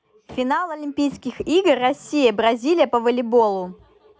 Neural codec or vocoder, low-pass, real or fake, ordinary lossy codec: none; none; real; none